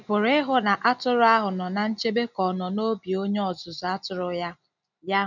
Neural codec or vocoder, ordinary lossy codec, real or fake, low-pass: none; none; real; 7.2 kHz